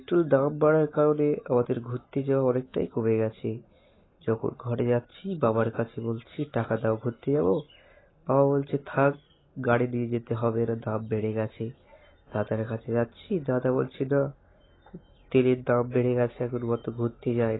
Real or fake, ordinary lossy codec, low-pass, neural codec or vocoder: real; AAC, 16 kbps; 7.2 kHz; none